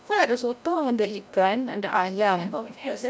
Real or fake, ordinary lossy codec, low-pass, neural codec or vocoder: fake; none; none; codec, 16 kHz, 0.5 kbps, FreqCodec, larger model